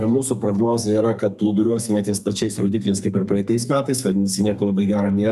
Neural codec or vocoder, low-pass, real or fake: codec, 32 kHz, 1.9 kbps, SNAC; 14.4 kHz; fake